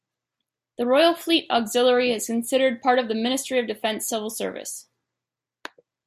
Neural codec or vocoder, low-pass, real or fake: none; 14.4 kHz; real